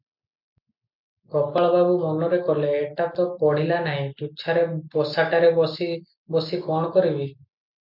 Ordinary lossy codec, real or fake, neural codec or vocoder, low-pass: MP3, 48 kbps; real; none; 5.4 kHz